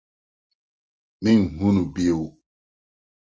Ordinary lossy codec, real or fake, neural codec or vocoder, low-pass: Opus, 24 kbps; real; none; 7.2 kHz